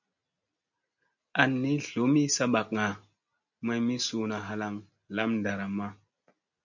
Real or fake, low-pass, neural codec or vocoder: real; 7.2 kHz; none